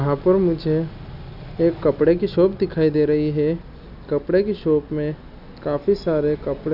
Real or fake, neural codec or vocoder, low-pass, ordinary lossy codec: real; none; 5.4 kHz; none